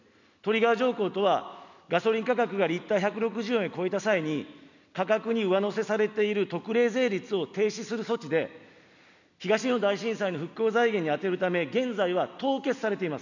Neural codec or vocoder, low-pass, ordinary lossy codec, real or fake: none; 7.2 kHz; none; real